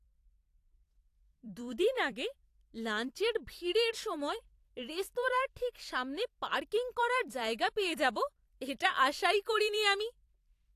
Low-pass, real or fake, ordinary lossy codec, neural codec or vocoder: 14.4 kHz; fake; AAC, 64 kbps; vocoder, 44.1 kHz, 128 mel bands every 256 samples, BigVGAN v2